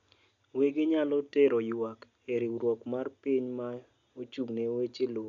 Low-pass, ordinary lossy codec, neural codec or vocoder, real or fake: 7.2 kHz; none; none; real